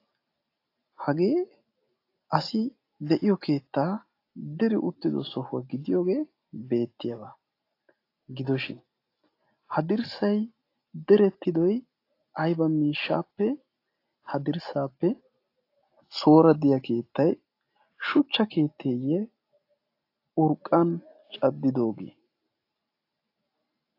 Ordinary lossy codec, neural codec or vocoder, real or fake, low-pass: AAC, 32 kbps; none; real; 5.4 kHz